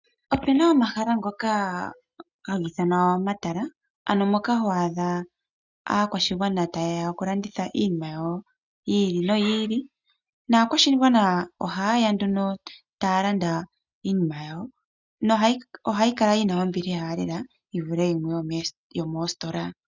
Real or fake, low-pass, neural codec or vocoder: real; 7.2 kHz; none